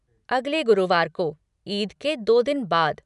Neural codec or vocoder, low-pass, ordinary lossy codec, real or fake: none; 10.8 kHz; none; real